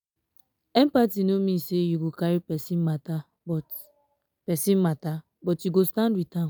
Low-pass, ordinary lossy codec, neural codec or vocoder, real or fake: none; none; none; real